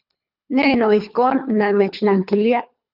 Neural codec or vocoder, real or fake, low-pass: codec, 24 kHz, 3 kbps, HILCodec; fake; 5.4 kHz